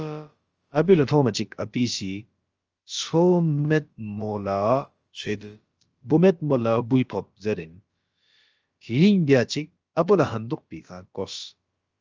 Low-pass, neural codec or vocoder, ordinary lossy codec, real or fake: 7.2 kHz; codec, 16 kHz, about 1 kbps, DyCAST, with the encoder's durations; Opus, 24 kbps; fake